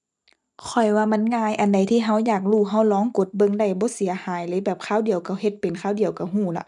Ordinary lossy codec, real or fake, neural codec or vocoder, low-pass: none; real; none; none